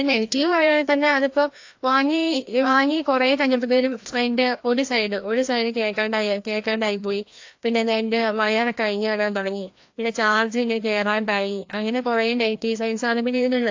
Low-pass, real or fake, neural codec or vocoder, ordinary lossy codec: 7.2 kHz; fake; codec, 16 kHz, 1 kbps, FreqCodec, larger model; AAC, 48 kbps